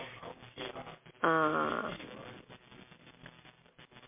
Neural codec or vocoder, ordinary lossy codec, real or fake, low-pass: none; MP3, 24 kbps; real; 3.6 kHz